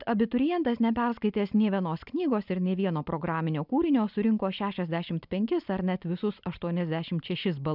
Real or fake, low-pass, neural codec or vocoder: real; 5.4 kHz; none